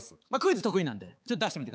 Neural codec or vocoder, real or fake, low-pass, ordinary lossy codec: codec, 16 kHz, 4 kbps, X-Codec, HuBERT features, trained on balanced general audio; fake; none; none